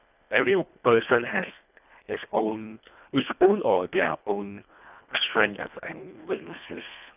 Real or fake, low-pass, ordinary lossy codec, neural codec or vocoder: fake; 3.6 kHz; none; codec, 24 kHz, 1.5 kbps, HILCodec